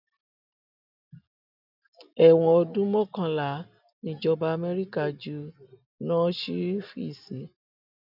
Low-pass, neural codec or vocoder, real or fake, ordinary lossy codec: 5.4 kHz; none; real; none